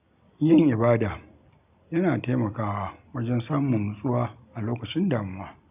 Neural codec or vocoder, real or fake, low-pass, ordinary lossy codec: none; real; 3.6 kHz; none